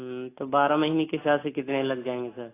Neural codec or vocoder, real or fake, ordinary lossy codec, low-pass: none; real; AAC, 16 kbps; 3.6 kHz